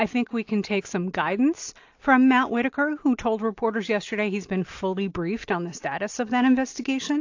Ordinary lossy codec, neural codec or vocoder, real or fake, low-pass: AAC, 48 kbps; none; real; 7.2 kHz